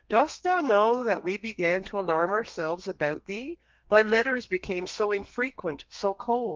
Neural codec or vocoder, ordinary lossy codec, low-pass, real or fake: codec, 32 kHz, 1.9 kbps, SNAC; Opus, 32 kbps; 7.2 kHz; fake